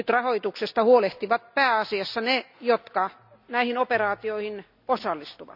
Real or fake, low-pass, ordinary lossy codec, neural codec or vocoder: real; 5.4 kHz; none; none